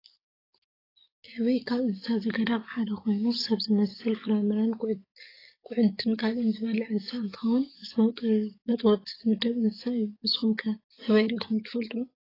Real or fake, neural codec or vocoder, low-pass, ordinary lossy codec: fake; codec, 44.1 kHz, 7.8 kbps, DAC; 5.4 kHz; AAC, 24 kbps